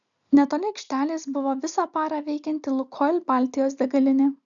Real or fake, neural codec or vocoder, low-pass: real; none; 7.2 kHz